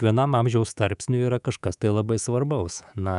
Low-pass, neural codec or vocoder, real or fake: 10.8 kHz; codec, 24 kHz, 3.1 kbps, DualCodec; fake